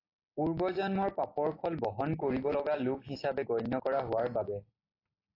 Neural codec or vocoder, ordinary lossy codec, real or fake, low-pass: none; AAC, 24 kbps; real; 5.4 kHz